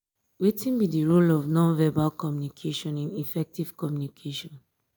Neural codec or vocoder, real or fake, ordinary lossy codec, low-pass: none; real; none; none